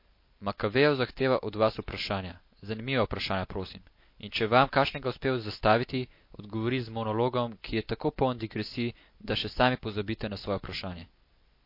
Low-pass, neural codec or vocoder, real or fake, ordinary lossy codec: 5.4 kHz; none; real; MP3, 32 kbps